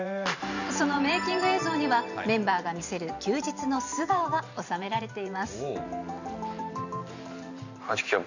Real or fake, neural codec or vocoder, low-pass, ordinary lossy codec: fake; vocoder, 44.1 kHz, 128 mel bands every 512 samples, BigVGAN v2; 7.2 kHz; none